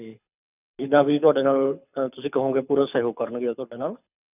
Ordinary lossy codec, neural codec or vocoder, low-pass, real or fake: none; codec, 24 kHz, 6 kbps, HILCodec; 3.6 kHz; fake